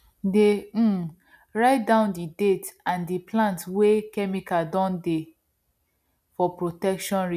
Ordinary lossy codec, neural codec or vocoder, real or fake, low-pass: none; none; real; 14.4 kHz